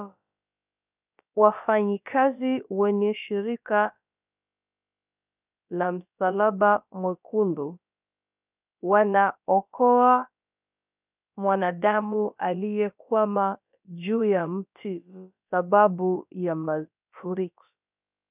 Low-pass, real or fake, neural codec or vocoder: 3.6 kHz; fake; codec, 16 kHz, about 1 kbps, DyCAST, with the encoder's durations